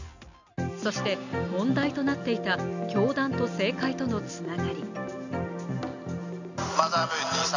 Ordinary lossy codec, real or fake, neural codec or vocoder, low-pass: none; real; none; 7.2 kHz